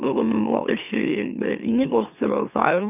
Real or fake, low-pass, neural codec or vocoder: fake; 3.6 kHz; autoencoder, 44.1 kHz, a latent of 192 numbers a frame, MeloTTS